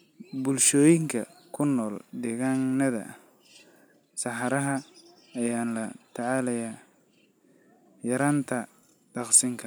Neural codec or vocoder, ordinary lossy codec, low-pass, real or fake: none; none; none; real